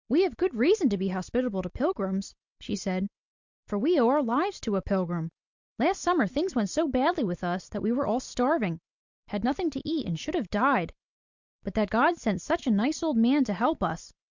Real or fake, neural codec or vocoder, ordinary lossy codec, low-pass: real; none; Opus, 64 kbps; 7.2 kHz